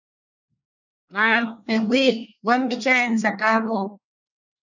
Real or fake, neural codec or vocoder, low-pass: fake; codec, 24 kHz, 1 kbps, SNAC; 7.2 kHz